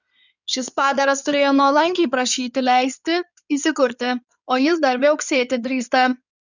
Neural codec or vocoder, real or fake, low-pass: codec, 16 kHz in and 24 kHz out, 2.2 kbps, FireRedTTS-2 codec; fake; 7.2 kHz